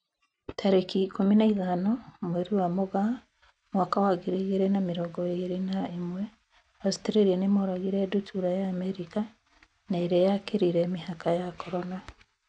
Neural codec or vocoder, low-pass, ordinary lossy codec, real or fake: none; 9.9 kHz; none; real